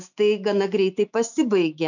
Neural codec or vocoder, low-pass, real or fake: autoencoder, 48 kHz, 128 numbers a frame, DAC-VAE, trained on Japanese speech; 7.2 kHz; fake